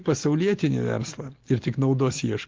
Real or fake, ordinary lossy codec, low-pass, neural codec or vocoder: real; Opus, 16 kbps; 7.2 kHz; none